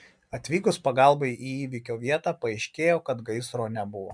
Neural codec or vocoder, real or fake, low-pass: none; real; 9.9 kHz